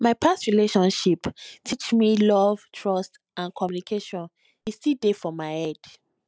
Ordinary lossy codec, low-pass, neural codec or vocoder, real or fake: none; none; none; real